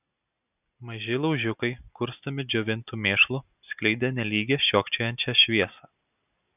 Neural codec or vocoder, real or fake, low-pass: none; real; 3.6 kHz